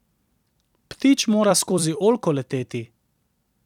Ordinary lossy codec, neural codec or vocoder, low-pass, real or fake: none; vocoder, 44.1 kHz, 128 mel bands every 256 samples, BigVGAN v2; 19.8 kHz; fake